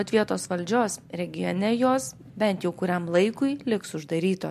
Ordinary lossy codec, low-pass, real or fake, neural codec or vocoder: MP3, 64 kbps; 14.4 kHz; real; none